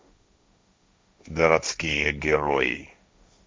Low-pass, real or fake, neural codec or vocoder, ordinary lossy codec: none; fake; codec, 16 kHz, 1.1 kbps, Voila-Tokenizer; none